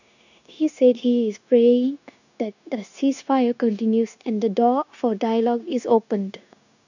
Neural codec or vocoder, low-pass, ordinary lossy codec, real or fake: codec, 16 kHz, 0.9 kbps, LongCat-Audio-Codec; 7.2 kHz; none; fake